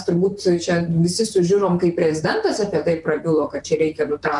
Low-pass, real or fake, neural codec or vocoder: 10.8 kHz; fake; vocoder, 44.1 kHz, 128 mel bands, Pupu-Vocoder